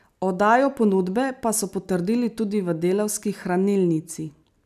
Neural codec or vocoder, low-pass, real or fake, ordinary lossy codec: none; 14.4 kHz; real; none